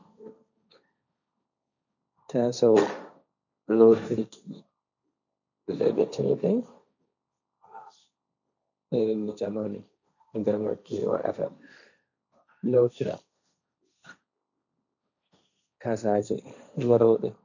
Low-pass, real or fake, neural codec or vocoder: 7.2 kHz; fake; codec, 16 kHz, 1.1 kbps, Voila-Tokenizer